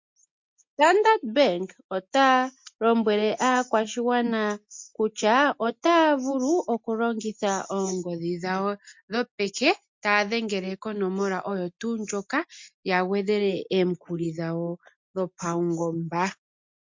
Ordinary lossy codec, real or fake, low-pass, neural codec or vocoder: MP3, 48 kbps; real; 7.2 kHz; none